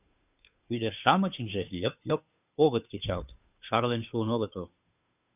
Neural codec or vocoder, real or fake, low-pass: codec, 16 kHz, 2 kbps, FunCodec, trained on Chinese and English, 25 frames a second; fake; 3.6 kHz